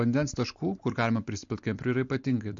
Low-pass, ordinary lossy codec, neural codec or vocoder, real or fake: 7.2 kHz; MP3, 48 kbps; none; real